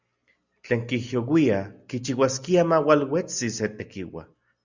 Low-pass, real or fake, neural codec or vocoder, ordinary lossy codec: 7.2 kHz; real; none; Opus, 64 kbps